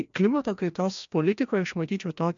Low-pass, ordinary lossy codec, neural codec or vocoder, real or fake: 7.2 kHz; MP3, 64 kbps; codec, 16 kHz, 1 kbps, FreqCodec, larger model; fake